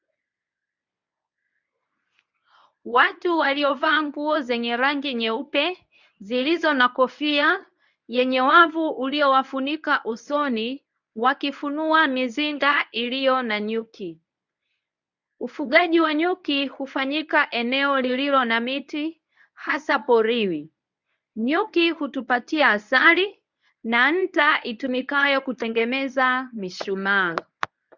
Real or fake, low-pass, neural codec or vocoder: fake; 7.2 kHz; codec, 24 kHz, 0.9 kbps, WavTokenizer, medium speech release version 1